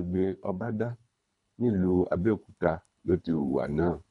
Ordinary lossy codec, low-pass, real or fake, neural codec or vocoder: none; 10.8 kHz; fake; codec, 24 kHz, 3 kbps, HILCodec